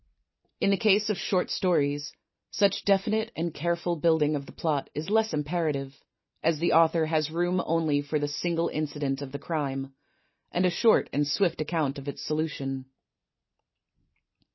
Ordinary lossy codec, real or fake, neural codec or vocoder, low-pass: MP3, 24 kbps; real; none; 7.2 kHz